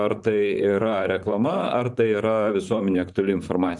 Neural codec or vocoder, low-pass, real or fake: vocoder, 44.1 kHz, 128 mel bands, Pupu-Vocoder; 10.8 kHz; fake